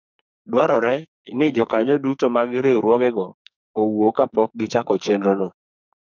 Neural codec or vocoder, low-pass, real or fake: codec, 44.1 kHz, 2.6 kbps, SNAC; 7.2 kHz; fake